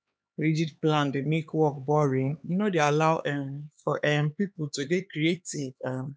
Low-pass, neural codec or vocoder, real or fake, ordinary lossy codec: none; codec, 16 kHz, 4 kbps, X-Codec, HuBERT features, trained on LibriSpeech; fake; none